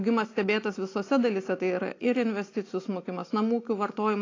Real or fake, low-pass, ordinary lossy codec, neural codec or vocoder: real; 7.2 kHz; AAC, 32 kbps; none